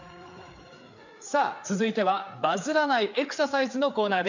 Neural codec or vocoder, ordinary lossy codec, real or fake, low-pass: codec, 16 kHz, 4 kbps, FreqCodec, larger model; none; fake; 7.2 kHz